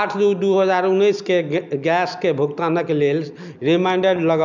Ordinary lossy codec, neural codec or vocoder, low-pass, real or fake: none; none; 7.2 kHz; real